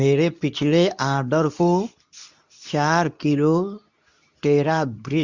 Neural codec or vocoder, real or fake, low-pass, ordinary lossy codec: codec, 24 kHz, 0.9 kbps, WavTokenizer, medium speech release version 2; fake; 7.2 kHz; Opus, 64 kbps